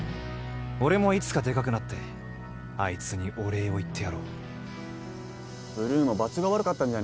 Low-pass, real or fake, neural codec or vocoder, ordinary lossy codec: none; real; none; none